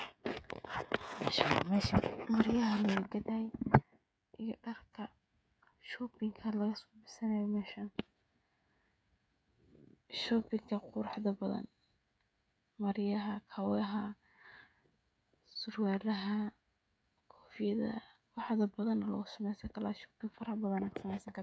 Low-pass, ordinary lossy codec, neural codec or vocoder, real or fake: none; none; codec, 16 kHz, 16 kbps, FreqCodec, smaller model; fake